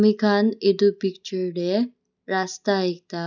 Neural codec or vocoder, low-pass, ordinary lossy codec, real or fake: none; 7.2 kHz; none; real